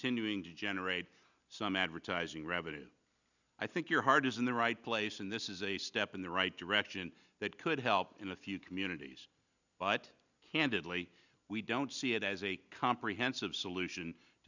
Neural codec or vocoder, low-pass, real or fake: none; 7.2 kHz; real